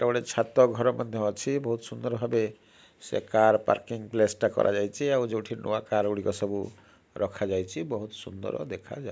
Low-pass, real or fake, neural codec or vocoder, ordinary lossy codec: none; real; none; none